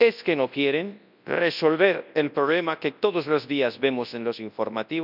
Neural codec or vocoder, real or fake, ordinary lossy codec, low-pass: codec, 24 kHz, 0.9 kbps, WavTokenizer, large speech release; fake; none; 5.4 kHz